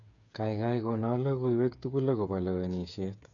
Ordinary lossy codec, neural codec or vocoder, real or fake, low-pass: none; codec, 16 kHz, 8 kbps, FreqCodec, smaller model; fake; 7.2 kHz